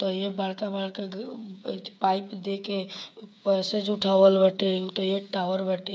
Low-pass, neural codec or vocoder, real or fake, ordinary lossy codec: none; codec, 16 kHz, 8 kbps, FreqCodec, smaller model; fake; none